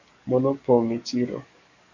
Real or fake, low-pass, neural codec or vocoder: fake; 7.2 kHz; codec, 44.1 kHz, 7.8 kbps, DAC